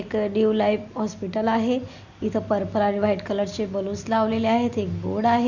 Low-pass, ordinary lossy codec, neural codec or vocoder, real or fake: 7.2 kHz; none; none; real